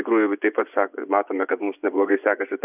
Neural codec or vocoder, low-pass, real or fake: vocoder, 24 kHz, 100 mel bands, Vocos; 3.6 kHz; fake